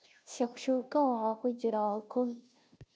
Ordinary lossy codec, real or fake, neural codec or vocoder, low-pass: none; fake; codec, 16 kHz, 0.5 kbps, FunCodec, trained on Chinese and English, 25 frames a second; none